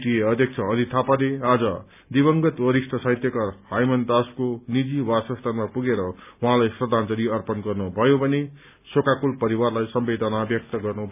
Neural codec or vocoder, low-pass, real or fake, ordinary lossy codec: none; 3.6 kHz; real; none